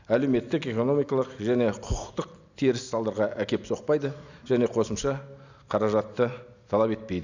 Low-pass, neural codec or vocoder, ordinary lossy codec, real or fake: 7.2 kHz; none; none; real